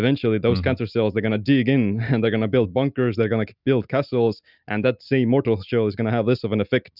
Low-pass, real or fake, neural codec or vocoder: 5.4 kHz; real; none